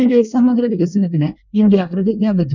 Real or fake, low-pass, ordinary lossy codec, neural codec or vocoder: fake; 7.2 kHz; none; codec, 24 kHz, 1 kbps, SNAC